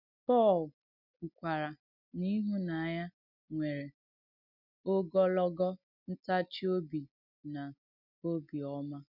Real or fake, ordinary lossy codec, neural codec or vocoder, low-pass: real; none; none; 5.4 kHz